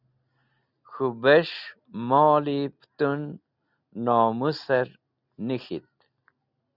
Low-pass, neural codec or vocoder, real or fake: 5.4 kHz; none; real